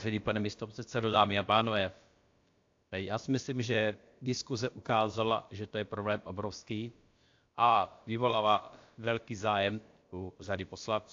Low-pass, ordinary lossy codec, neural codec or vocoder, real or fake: 7.2 kHz; AAC, 48 kbps; codec, 16 kHz, about 1 kbps, DyCAST, with the encoder's durations; fake